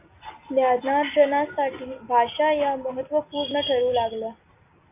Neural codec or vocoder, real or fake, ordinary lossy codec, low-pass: none; real; MP3, 24 kbps; 3.6 kHz